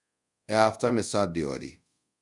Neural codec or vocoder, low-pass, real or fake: codec, 24 kHz, 0.5 kbps, DualCodec; 10.8 kHz; fake